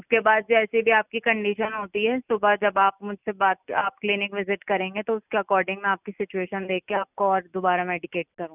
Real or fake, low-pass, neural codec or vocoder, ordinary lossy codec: real; 3.6 kHz; none; none